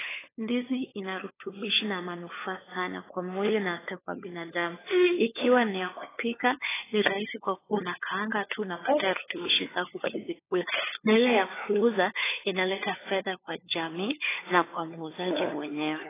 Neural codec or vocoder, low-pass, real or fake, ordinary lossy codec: codec, 16 kHz, 4 kbps, FunCodec, trained on LibriTTS, 50 frames a second; 3.6 kHz; fake; AAC, 16 kbps